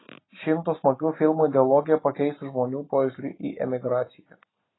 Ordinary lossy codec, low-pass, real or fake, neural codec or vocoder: AAC, 16 kbps; 7.2 kHz; real; none